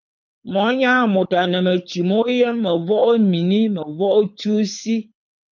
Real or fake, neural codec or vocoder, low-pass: fake; codec, 24 kHz, 6 kbps, HILCodec; 7.2 kHz